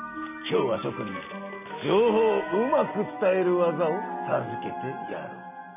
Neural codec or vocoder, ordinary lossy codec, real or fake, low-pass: none; none; real; 3.6 kHz